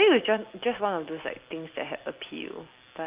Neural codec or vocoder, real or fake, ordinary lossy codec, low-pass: none; real; Opus, 32 kbps; 3.6 kHz